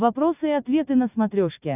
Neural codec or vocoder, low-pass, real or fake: none; 3.6 kHz; real